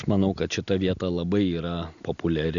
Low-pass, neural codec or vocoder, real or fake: 7.2 kHz; none; real